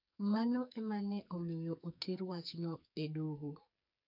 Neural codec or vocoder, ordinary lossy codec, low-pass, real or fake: codec, 44.1 kHz, 2.6 kbps, SNAC; none; 5.4 kHz; fake